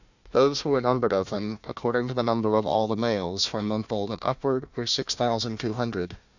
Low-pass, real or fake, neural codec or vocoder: 7.2 kHz; fake; codec, 16 kHz, 1 kbps, FunCodec, trained on Chinese and English, 50 frames a second